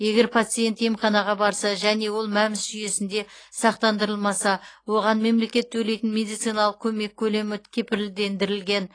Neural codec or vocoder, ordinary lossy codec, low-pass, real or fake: none; AAC, 32 kbps; 9.9 kHz; real